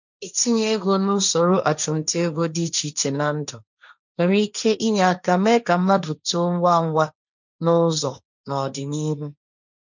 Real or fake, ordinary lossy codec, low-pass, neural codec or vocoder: fake; none; 7.2 kHz; codec, 16 kHz, 1.1 kbps, Voila-Tokenizer